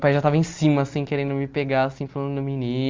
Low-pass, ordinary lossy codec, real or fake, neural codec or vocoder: 7.2 kHz; Opus, 32 kbps; real; none